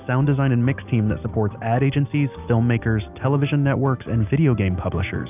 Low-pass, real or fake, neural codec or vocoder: 3.6 kHz; real; none